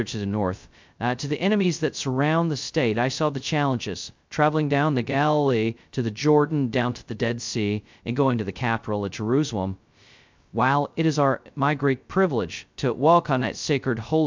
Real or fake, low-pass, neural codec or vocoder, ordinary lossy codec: fake; 7.2 kHz; codec, 16 kHz, 0.2 kbps, FocalCodec; MP3, 64 kbps